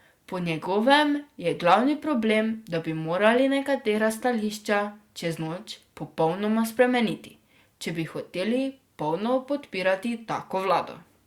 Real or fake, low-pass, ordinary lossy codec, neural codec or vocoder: real; 19.8 kHz; Opus, 64 kbps; none